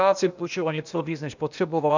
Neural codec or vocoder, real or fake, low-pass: codec, 16 kHz, 0.8 kbps, ZipCodec; fake; 7.2 kHz